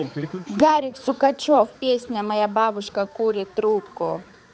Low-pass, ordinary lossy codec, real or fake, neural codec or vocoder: none; none; fake; codec, 16 kHz, 4 kbps, X-Codec, HuBERT features, trained on balanced general audio